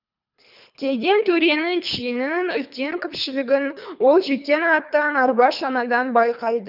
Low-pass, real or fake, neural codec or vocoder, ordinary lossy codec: 5.4 kHz; fake; codec, 24 kHz, 3 kbps, HILCodec; none